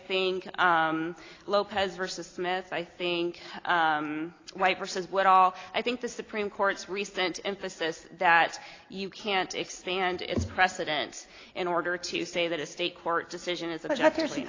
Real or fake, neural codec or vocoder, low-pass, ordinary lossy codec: real; none; 7.2 kHz; AAC, 32 kbps